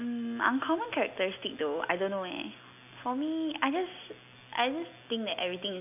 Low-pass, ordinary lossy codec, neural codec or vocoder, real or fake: 3.6 kHz; AAC, 32 kbps; none; real